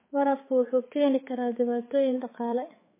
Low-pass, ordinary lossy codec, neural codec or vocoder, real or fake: 3.6 kHz; MP3, 16 kbps; codec, 16 kHz, 4 kbps, X-Codec, HuBERT features, trained on balanced general audio; fake